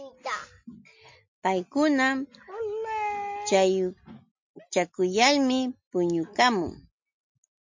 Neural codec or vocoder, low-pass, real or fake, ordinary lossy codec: none; 7.2 kHz; real; MP3, 48 kbps